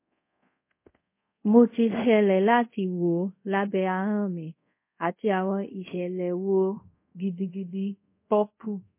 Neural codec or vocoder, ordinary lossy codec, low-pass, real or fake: codec, 24 kHz, 0.5 kbps, DualCodec; MP3, 24 kbps; 3.6 kHz; fake